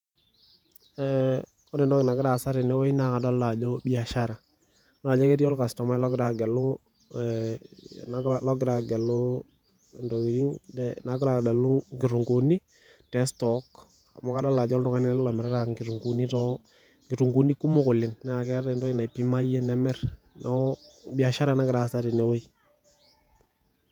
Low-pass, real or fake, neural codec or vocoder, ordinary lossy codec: 19.8 kHz; fake; vocoder, 48 kHz, 128 mel bands, Vocos; none